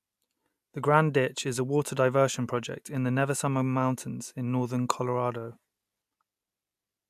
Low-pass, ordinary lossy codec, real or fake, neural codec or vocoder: 14.4 kHz; AAC, 96 kbps; real; none